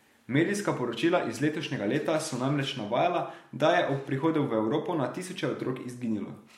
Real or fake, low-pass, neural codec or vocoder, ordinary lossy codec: real; 19.8 kHz; none; MP3, 64 kbps